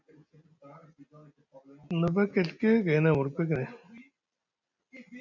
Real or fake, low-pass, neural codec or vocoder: real; 7.2 kHz; none